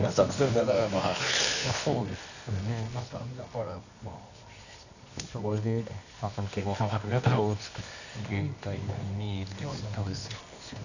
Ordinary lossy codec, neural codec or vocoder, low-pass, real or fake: none; codec, 24 kHz, 0.9 kbps, WavTokenizer, medium music audio release; 7.2 kHz; fake